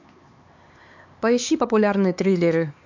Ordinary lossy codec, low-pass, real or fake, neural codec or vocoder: MP3, 64 kbps; 7.2 kHz; fake; codec, 16 kHz, 4 kbps, X-Codec, HuBERT features, trained on LibriSpeech